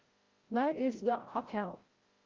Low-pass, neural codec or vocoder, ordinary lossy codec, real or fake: 7.2 kHz; codec, 16 kHz, 0.5 kbps, FreqCodec, larger model; Opus, 16 kbps; fake